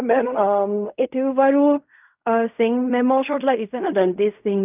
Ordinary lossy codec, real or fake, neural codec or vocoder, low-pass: none; fake; codec, 16 kHz in and 24 kHz out, 0.4 kbps, LongCat-Audio-Codec, fine tuned four codebook decoder; 3.6 kHz